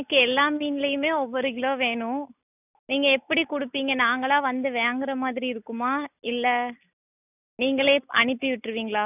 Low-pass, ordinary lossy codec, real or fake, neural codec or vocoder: 3.6 kHz; none; real; none